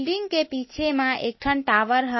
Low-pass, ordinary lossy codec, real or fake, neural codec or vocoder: 7.2 kHz; MP3, 24 kbps; real; none